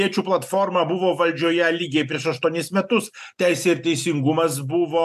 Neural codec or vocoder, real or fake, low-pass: none; real; 14.4 kHz